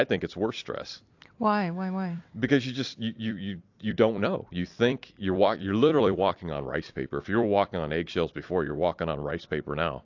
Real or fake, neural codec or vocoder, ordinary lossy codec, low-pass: fake; vocoder, 44.1 kHz, 128 mel bands every 256 samples, BigVGAN v2; AAC, 48 kbps; 7.2 kHz